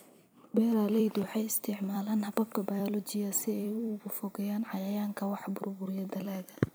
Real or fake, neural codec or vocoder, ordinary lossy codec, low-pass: fake; vocoder, 44.1 kHz, 128 mel bands every 256 samples, BigVGAN v2; none; none